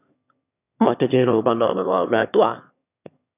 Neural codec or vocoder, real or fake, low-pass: autoencoder, 22.05 kHz, a latent of 192 numbers a frame, VITS, trained on one speaker; fake; 3.6 kHz